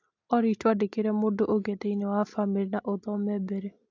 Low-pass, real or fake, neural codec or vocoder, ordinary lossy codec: 7.2 kHz; real; none; AAC, 48 kbps